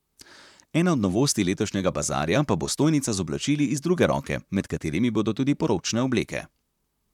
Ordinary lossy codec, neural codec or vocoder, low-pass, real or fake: none; vocoder, 44.1 kHz, 128 mel bands, Pupu-Vocoder; 19.8 kHz; fake